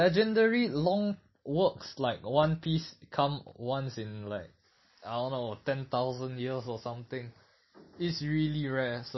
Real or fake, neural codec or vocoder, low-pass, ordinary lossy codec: real; none; 7.2 kHz; MP3, 24 kbps